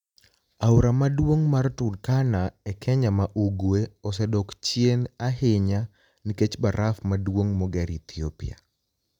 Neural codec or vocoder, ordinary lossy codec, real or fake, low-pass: none; none; real; 19.8 kHz